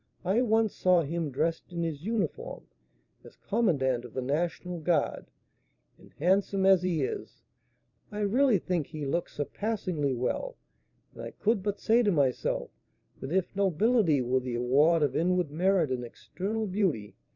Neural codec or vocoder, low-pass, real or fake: vocoder, 44.1 kHz, 128 mel bands every 512 samples, BigVGAN v2; 7.2 kHz; fake